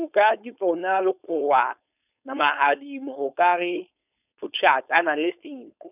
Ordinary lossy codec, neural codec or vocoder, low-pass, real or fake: none; codec, 16 kHz, 4.8 kbps, FACodec; 3.6 kHz; fake